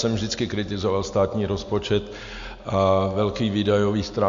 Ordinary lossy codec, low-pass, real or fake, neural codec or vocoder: AAC, 64 kbps; 7.2 kHz; real; none